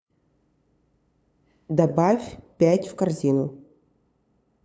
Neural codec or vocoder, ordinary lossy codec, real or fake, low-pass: codec, 16 kHz, 8 kbps, FunCodec, trained on LibriTTS, 25 frames a second; none; fake; none